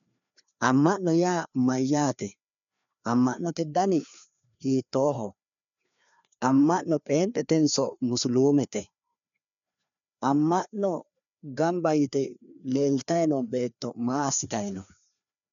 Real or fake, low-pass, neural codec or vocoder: fake; 7.2 kHz; codec, 16 kHz, 2 kbps, FreqCodec, larger model